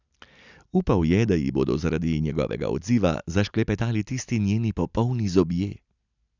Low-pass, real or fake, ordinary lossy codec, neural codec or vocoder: 7.2 kHz; real; none; none